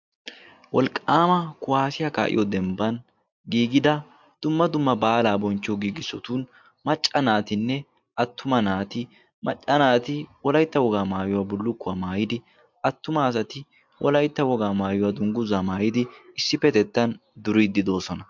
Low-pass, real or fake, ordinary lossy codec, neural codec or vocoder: 7.2 kHz; real; MP3, 64 kbps; none